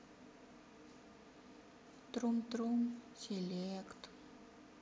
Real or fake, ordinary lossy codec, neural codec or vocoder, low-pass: real; none; none; none